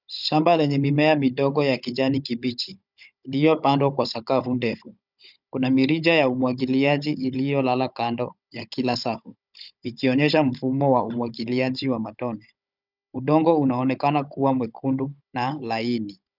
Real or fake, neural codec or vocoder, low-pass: fake; codec, 16 kHz, 16 kbps, FunCodec, trained on Chinese and English, 50 frames a second; 5.4 kHz